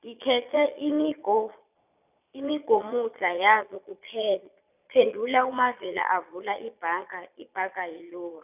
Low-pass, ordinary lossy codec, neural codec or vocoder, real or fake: 3.6 kHz; none; vocoder, 22.05 kHz, 80 mel bands, Vocos; fake